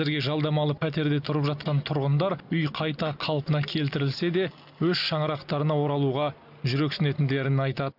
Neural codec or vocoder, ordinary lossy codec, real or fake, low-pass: none; none; real; 5.4 kHz